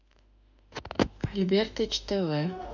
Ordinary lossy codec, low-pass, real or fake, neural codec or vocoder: none; 7.2 kHz; fake; autoencoder, 48 kHz, 32 numbers a frame, DAC-VAE, trained on Japanese speech